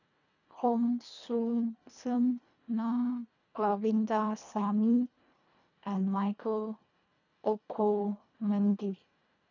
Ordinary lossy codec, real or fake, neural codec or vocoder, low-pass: none; fake; codec, 24 kHz, 1.5 kbps, HILCodec; 7.2 kHz